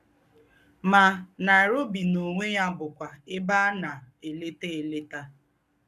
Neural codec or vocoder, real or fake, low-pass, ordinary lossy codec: codec, 44.1 kHz, 7.8 kbps, Pupu-Codec; fake; 14.4 kHz; none